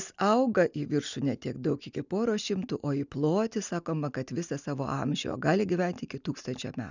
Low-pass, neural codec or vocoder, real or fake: 7.2 kHz; none; real